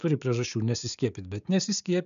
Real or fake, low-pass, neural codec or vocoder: fake; 7.2 kHz; codec, 16 kHz, 6 kbps, DAC